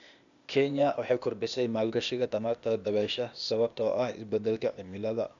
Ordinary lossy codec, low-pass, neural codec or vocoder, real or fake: none; 7.2 kHz; codec, 16 kHz, 0.8 kbps, ZipCodec; fake